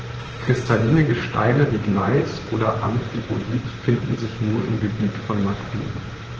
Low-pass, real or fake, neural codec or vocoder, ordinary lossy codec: 7.2 kHz; fake; vocoder, 44.1 kHz, 128 mel bands, Pupu-Vocoder; Opus, 16 kbps